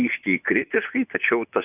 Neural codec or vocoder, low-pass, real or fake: vocoder, 24 kHz, 100 mel bands, Vocos; 3.6 kHz; fake